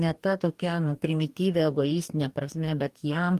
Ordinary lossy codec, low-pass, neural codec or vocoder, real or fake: Opus, 32 kbps; 14.4 kHz; codec, 44.1 kHz, 2.6 kbps, DAC; fake